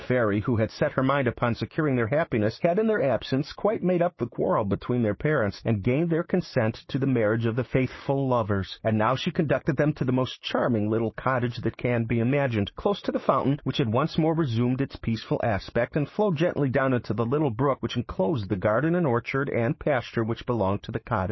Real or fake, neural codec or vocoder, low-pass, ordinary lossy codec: fake; codec, 16 kHz, 6 kbps, DAC; 7.2 kHz; MP3, 24 kbps